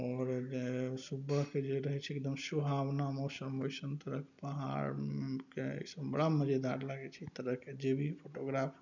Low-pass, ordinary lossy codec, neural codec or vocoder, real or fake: 7.2 kHz; none; none; real